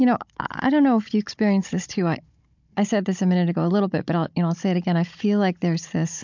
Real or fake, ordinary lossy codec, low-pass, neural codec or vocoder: fake; MP3, 64 kbps; 7.2 kHz; codec, 16 kHz, 16 kbps, FunCodec, trained on Chinese and English, 50 frames a second